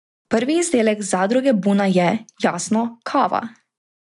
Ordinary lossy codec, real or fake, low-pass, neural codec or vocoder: none; real; 10.8 kHz; none